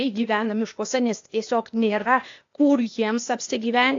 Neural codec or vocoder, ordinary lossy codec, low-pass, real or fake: codec, 16 kHz, 0.8 kbps, ZipCodec; AAC, 48 kbps; 7.2 kHz; fake